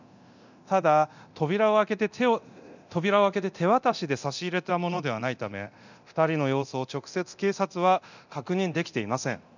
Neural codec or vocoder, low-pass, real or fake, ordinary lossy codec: codec, 24 kHz, 0.9 kbps, DualCodec; 7.2 kHz; fake; none